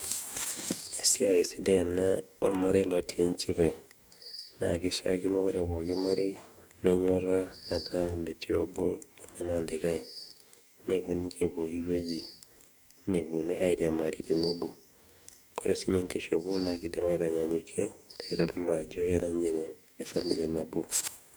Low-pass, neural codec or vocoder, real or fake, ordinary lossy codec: none; codec, 44.1 kHz, 2.6 kbps, DAC; fake; none